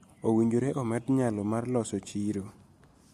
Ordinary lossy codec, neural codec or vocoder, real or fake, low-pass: MP3, 64 kbps; none; real; 14.4 kHz